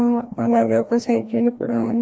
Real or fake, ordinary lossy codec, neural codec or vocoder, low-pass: fake; none; codec, 16 kHz, 1 kbps, FreqCodec, larger model; none